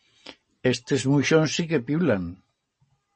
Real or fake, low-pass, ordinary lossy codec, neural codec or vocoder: real; 10.8 kHz; MP3, 32 kbps; none